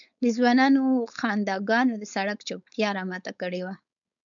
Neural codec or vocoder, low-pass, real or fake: codec, 16 kHz, 4.8 kbps, FACodec; 7.2 kHz; fake